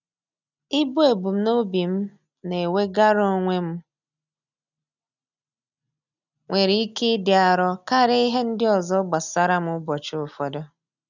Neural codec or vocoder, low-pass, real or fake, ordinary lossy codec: none; 7.2 kHz; real; none